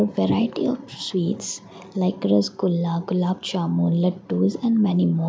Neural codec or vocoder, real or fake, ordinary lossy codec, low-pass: none; real; none; none